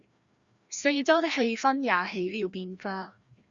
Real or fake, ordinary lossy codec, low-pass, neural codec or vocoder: fake; Opus, 64 kbps; 7.2 kHz; codec, 16 kHz, 1 kbps, FreqCodec, larger model